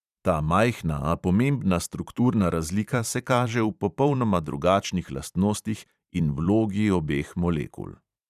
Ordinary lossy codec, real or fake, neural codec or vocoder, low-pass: none; fake; vocoder, 44.1 kHz, 128 mel bands every 512 samples, BigVGAN v2; 14.4 kHz